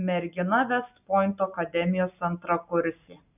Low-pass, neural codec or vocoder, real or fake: 3.6 kHz; none; real